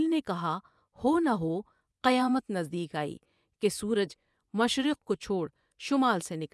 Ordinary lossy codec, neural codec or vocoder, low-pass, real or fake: none; vocoder, 24 kHz, 100 mel bands, Vocos; none; fake